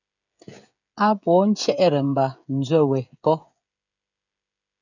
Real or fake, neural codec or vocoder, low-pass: fake; codec, 16 kHz, 16 kbps, FreqCodec, smaller model; 7.2 kHz